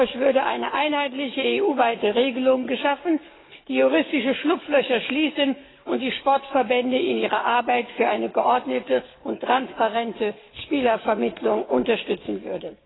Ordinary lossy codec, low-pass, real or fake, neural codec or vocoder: AAC, 16 kbps; 7.2 kHz; fake; codec, 44.1 kHz, 7.8 kbps, DAC